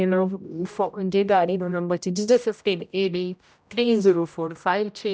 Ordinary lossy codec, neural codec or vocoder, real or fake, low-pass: none; codec, 16 kHz, 0.5 kbps, X-Codec, HuBERT features, trained on general audio; fake; none